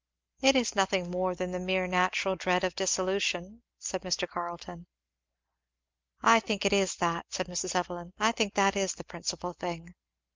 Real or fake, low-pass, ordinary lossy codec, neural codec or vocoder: real; 7.2 kHz; Opus, 32 kbps; none